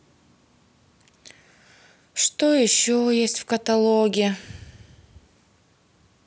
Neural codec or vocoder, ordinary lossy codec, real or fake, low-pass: none; none; real; none